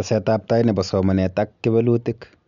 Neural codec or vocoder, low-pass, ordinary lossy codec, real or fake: none; 7.2 kHz; none; real